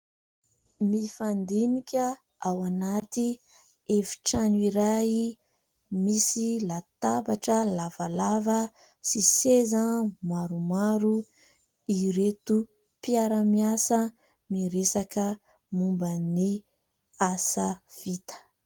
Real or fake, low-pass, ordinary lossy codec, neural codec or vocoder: real; 19.8 kHz; Opus, 24 kbps; none